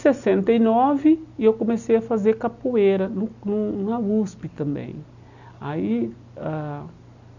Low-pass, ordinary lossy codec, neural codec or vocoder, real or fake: 7.2 kHz; none; none; real